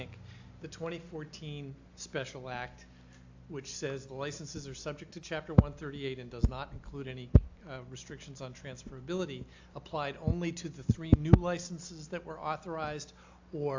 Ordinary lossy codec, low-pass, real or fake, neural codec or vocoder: AAC, 48 kbps; 7.2 kHz; real; none